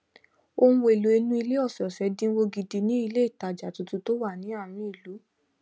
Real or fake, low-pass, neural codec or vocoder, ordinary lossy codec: real; none; none; none